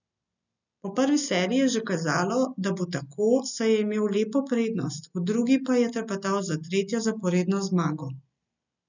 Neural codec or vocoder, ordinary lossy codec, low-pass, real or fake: none; none; 7.2 kHz; real